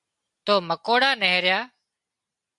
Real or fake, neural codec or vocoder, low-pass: real; none; 10.8 kHz